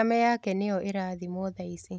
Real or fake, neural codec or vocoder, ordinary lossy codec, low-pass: real; none; none; none